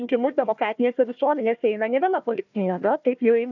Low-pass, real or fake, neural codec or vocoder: 7.2 kHz; fake; codec, 16 kHz, 1 kbps, FunCodec, trained on Chinese and English, 50 frames a second